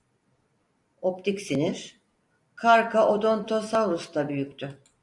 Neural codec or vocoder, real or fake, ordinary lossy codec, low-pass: vocoder, 44.1 kHz, 128 mel bands every 256 samples, BigVGAN v2; fake; AAC, 64 kbps; 10.8 kHz